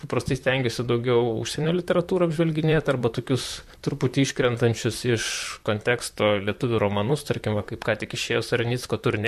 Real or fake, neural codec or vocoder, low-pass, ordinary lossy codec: fake; vocoder, 44.1 kHz, 128 mel bands, Pupu-Vocoder; 14.4 kHz; MP3, 64 kbps